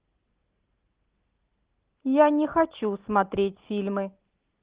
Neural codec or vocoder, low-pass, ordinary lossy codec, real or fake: none; 3.6 kHz; Opus, 16 kbps; real